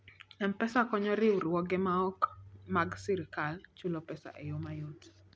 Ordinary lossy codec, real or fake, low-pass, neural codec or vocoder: none; real; none; none